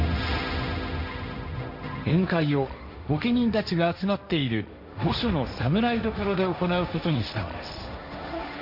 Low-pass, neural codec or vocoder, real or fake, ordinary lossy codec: 5.4 kHz; codec, 16 kHz, 1.1 kbps, Voila-Tokenizer; fake; none